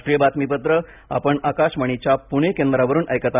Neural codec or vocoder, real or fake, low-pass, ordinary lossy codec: none; real; 3.6 kHz; none